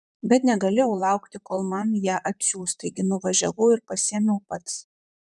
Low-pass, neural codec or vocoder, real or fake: 10.8 kHz; vocoder, 44.1 kHz, 128 mel bands, Pupu-Vocoder; fake